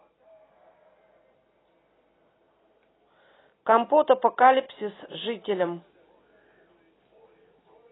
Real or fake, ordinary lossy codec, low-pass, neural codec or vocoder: real; AAC, 16 kbps; 7.2 kHz; none